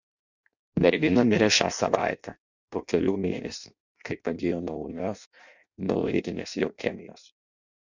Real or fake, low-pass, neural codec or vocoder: fake; 7.2 kHz; codec, 16 kHz in and 24 kHz out, 0.6 kbps, FireRedTTS-2 codec